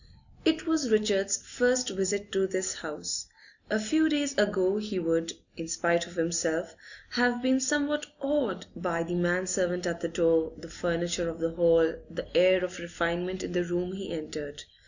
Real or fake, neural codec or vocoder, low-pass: real; none; 7.2 kHz